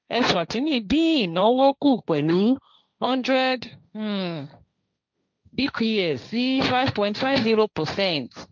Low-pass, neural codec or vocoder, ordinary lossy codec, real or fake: 7.2 kHz; codec, 16 kHz, 1.1 kbps, Voila-Tokenizer; none; fake